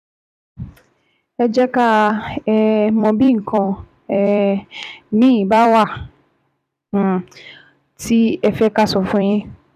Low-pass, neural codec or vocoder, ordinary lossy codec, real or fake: 14.4 kHz; vocoder, 44.1 kHz, 128 mel bands every 256 samples, BigVGAN v2; none; fake